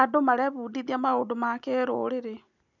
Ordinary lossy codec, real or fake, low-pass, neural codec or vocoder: none; real; 7.2 kHz; none